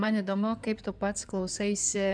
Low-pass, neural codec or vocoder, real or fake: 9.9 kHz; codec, 16 kHz in and 24 kHz out, 2.2 kbps, FireRedTTS-2 codec; fake